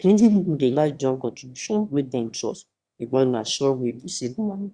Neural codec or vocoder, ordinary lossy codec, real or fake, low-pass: autoencoder, 22.05 kHz, a latent of 192 numbers a frame, VITS, trained on one speaker; Opus, 64 kbps; fake; 9.9 kHz